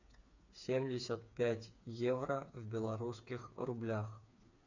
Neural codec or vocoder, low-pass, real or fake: codec, 16 kHz, 4 kbps, FreqCodec, smaller model; 7.2 kHz; fake